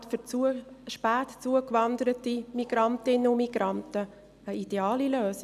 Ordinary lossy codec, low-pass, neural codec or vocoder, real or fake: none; 14.4 kHz; none; real